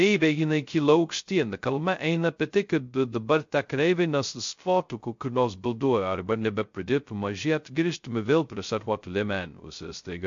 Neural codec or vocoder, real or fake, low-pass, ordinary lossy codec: codec, 16 kHz, 0.2 kbps, FocalCodec; fake; 7.2 kHz; MP3, 48 kbps